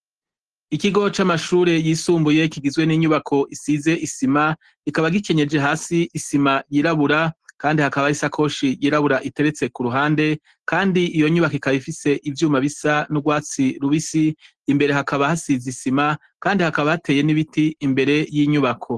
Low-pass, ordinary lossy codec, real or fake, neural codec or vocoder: 10.8 kHz; Opus, 16 kbps; real; none